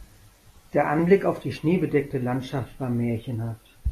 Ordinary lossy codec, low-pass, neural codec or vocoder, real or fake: AAC, 48 kbps; 14.4 kHz; none; real